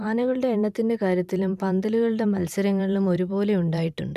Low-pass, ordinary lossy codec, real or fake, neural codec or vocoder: 14.4 kHz; none; fake; vocoder, 44.1 kHz, 128 mel bands every 512 samples, BigVGAN v2